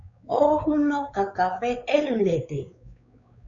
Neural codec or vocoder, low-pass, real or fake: codec, 16 kHz, 4 kbps, X-Codec, WavLM features, trained on Multilingual LibriSpeech; 7.2 kHz; fake